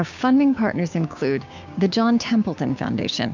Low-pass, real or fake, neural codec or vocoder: 7.2 kHz; fake; codec, 16 kHz, 6 kbps, DAC